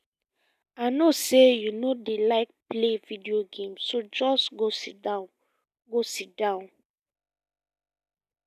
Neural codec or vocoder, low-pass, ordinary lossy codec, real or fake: none; 14.4 kHz; none; real